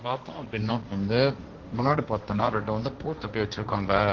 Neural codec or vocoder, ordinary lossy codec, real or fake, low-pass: codec, 16 kHz, 1.1 kbps, Voila-Tokenizer; Opus, 16 kbps; fake; 7.2 kHz